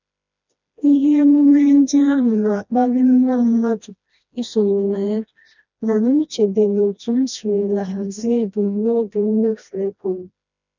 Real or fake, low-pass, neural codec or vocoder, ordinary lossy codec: fake; 7.2 kHz; codec, 16 kHz, 1 kbps, FreqCodec, smaller model; none